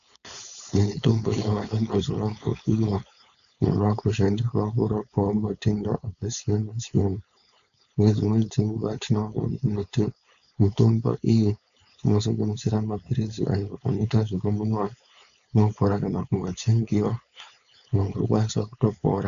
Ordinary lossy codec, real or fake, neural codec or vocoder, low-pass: AAC, 96 kbps; fake; codec, 16 kHz, 4.8 kbps, FACodec; 7.2 kHz